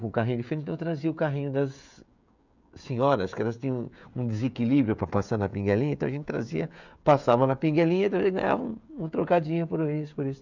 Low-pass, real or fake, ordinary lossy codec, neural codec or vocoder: 7.2 kHz; fake; none; codec, 16 kHz, 16 kbps, FreqCodec, smaller model